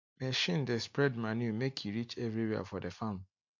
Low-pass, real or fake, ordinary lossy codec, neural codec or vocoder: 7.2 kHz; real; MP3, 48 kbps; none